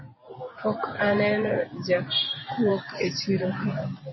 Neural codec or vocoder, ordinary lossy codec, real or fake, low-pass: vocoder, 44.1 kHz, 128 mel bands every 512 samples, BigVGAN v2; MP3, 24 kbps; fake; 7.2 kHz